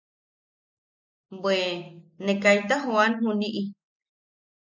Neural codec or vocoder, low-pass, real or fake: none; 7.2 kHz; real